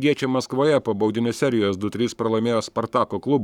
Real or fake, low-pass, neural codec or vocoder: fake; 19.8 kHz; codec, 44.1 kHz, 7.8 kbps, Pupu-Codec